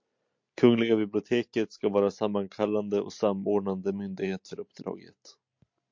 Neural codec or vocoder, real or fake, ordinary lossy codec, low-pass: none; real; MP3, 48 kbps; 7.2 kHz